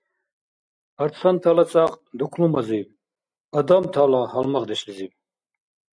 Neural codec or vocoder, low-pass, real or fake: none; 9.9 kHz; real